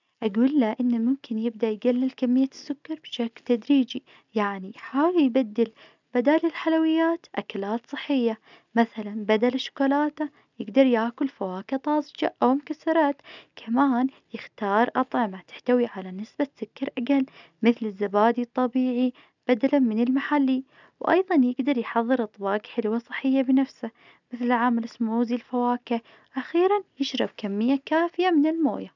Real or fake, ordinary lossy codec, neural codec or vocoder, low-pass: real; none; none; 7.2 kHz